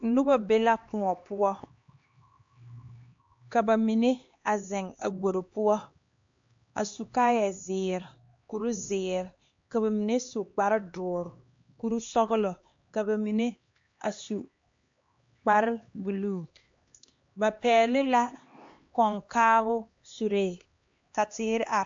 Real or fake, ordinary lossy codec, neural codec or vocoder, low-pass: fake; MP3, 48 kbps; codec, 16 kHz, 2 kbps, X-Codec, HuBERT features, trained on LibriSpeech; 7.2 kHz